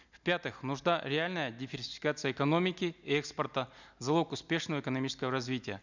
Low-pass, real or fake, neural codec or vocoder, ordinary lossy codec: 7.2 kHz; real; none; none